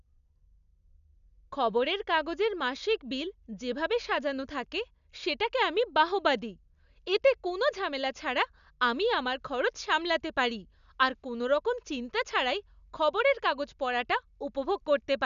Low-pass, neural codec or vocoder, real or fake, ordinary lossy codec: 7.2 kHz; none; real; none